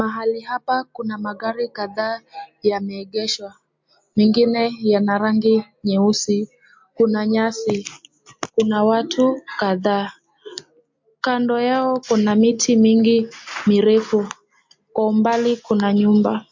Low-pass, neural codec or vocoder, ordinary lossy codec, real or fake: 7.2 kHz; none; MP3, 48 kbps; real